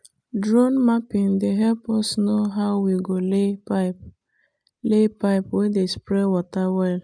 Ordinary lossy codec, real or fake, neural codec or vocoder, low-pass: none; real; none; 9.9 kHz